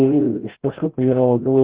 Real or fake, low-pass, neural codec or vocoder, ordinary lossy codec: fake; 3.6 kHz; codec, 16 kHz, 0.5 kbps, FreqCodec, larger model; Opus, 16 kbps